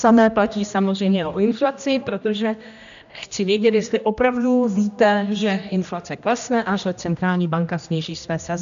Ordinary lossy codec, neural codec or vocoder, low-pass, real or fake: AAC, 96 kbps; codec, 16 kHz, 1 kbps, X-Codec, HuBERT features, trained on general audio; 7.2 kHz; fake